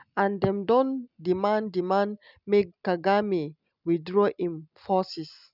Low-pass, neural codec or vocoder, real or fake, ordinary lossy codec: 5.4 kHz; none; real; none